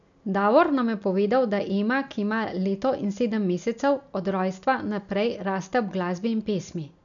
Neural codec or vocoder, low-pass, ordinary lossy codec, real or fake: none; 7.2 kHz; MP3, 96 kbps; real